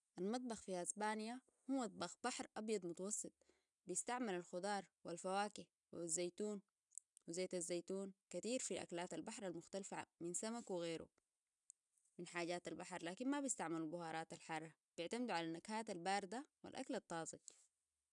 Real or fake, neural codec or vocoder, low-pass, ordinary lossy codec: real; none; 10.8 kHz; none